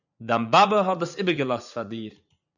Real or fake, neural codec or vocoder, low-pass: fake; vocoder, 44.1 kHz, 80 mel bands, Vocos; 7.2 kHz